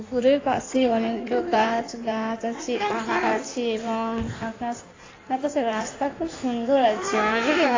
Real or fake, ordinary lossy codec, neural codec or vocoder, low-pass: fake; AAC, 32 kbps; codec, 16 kHz in and 24 kHz out, 1.1 kbps, FireRedTTS-2 codec; 7.2 kHz